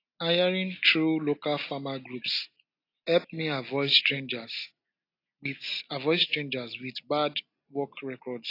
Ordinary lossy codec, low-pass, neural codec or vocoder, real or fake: AAC, 32 kbps; 5.4 kHz; none; real